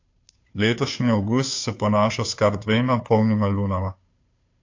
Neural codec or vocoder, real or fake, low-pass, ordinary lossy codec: codec, 16 kHz, 2 kbps, FunCodec, trained on Chinese and English, 25 frames a second; fake; 7.2 kHz; AAC, 48 kbps